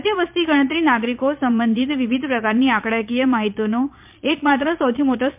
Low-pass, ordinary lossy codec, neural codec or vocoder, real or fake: 3.6 kHz; MP3, 32 kbps; vocoder, 22.05 kHz, 80 mel bands, Vocos; fake